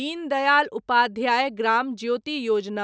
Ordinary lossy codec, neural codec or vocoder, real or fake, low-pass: none; none; real; none